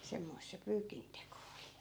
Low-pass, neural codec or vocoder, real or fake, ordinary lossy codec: none; none; real; none